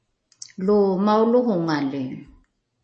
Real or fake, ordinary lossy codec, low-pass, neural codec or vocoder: real; MP3, 32 kbps; 10.8 kHz; none